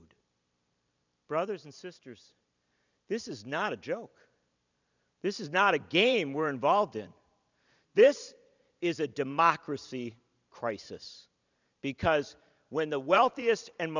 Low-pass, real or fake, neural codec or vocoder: 7.2 kHz; fake; vocoder, 44.1 kHz, 128 mel bands every 512 samples, BigVGAN v2